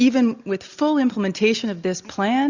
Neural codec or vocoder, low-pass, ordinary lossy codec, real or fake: none; 7.2 kHz; Opus, 64 kbps; real